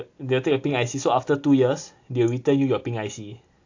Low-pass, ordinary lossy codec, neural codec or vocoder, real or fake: 7.2 kHz; AAC, 48 kbps; none; real